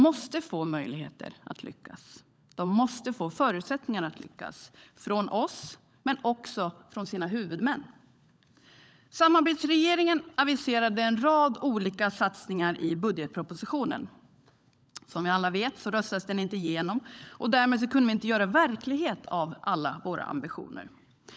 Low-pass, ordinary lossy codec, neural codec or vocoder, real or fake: none; none; codec, 16 kHz, 16 kbps, FunCodec, trained on LibriTTS, 50 frames a second; fake